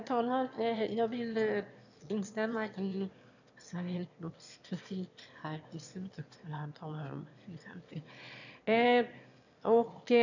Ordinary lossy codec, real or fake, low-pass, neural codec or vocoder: none; fake; 7.2 kHz; autoencoder, 22.05 kHz, a latent of 192 numbers a frame, VITS, trained on one speaker